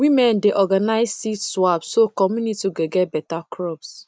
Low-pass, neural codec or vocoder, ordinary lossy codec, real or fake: none; none; none; real